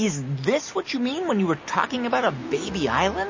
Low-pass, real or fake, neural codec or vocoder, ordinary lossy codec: 7.2 kHz; real; none; MP3, 32 kbps